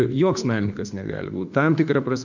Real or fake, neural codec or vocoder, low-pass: fake; autoencoder, 48 kHz, 32 numbers a frame, DAC-VAE, trained on Japanese speech; 7.2 kHz